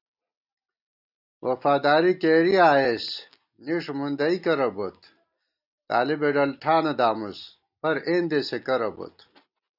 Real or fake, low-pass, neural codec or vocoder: real; 5.4 kHz; none